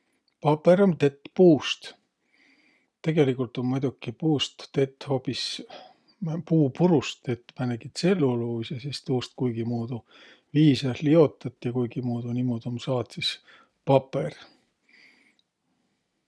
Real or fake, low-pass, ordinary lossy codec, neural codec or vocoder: real; none; none; none